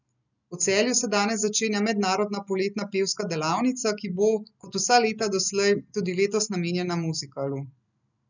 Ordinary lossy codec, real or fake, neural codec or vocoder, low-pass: none; real; none; 7.2 kHz